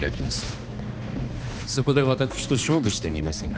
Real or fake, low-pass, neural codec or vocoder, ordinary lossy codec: fake; none; codec, 16 kHz, 2 kbps, X-Codec, HuBERT features, trained on balanced general audio; none